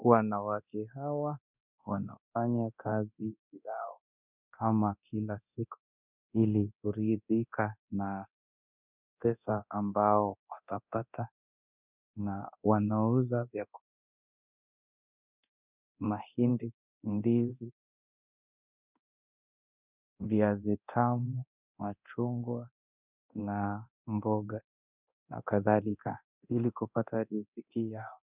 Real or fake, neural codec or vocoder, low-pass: fake; codec, 24 kHz, 0.9 kbps, DualCodec; 3.6 kHz